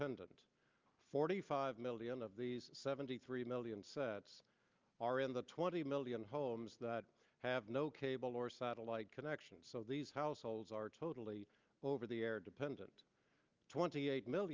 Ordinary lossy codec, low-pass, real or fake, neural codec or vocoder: Opus, 24 kbps; 7.2 kHz; real; none